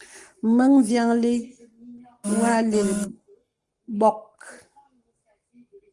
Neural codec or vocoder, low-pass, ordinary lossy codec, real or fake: codec, 44.1 kHz, 7.8 kbps, Pupu-Codec; 10.8 kHz; Opus, 24 kbps; fake